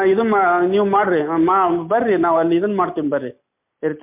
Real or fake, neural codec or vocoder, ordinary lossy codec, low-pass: real; none; MP3, 32 kbps; 3.6 kHz